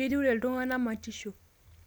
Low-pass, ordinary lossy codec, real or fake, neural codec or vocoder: none; none; real; none